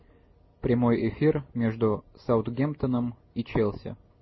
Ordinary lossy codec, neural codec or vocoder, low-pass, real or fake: MP3, 24 kbps; none; 7.2 kHz; real